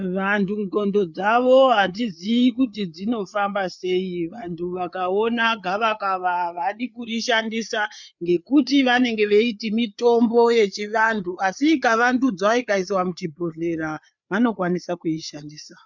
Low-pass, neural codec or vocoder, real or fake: 7.2 kHz; codec, 16 kHz, 4 kbps, FreqCodec, larger model; fake